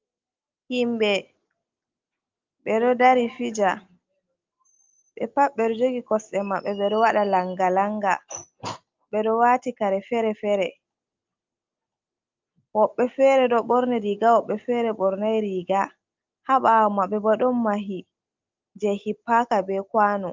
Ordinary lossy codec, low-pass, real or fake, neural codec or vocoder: Opus, 24 kbps; 7.2 kHz; real; none